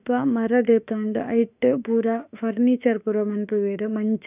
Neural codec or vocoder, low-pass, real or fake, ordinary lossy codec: autoencoder, 48 kHz, 32 numbers a frame, DAC-VAE, trained on Japanese speech; 3.6 kHz; fake; none